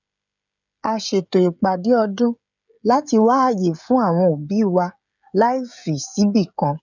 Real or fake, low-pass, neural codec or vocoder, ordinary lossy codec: fake; 7.2 kHz; codec, 16 kHz, 16 kbps, FreqCodec, smaller model; none